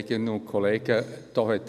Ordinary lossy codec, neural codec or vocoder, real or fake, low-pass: none; none; real; 14.4 kHz